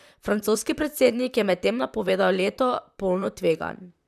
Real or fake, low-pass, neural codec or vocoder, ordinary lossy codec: fake; 14.4 kHz; vocoder, 44.1 kHz, 128 mel bands, Pupu-Vocoder; none